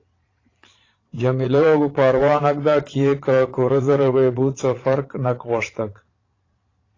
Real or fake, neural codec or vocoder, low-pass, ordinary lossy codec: fake; vocoder, 22.05 kHz, 80 mel bands, Vocos; 7.2 kHz; AAC, 32 kbps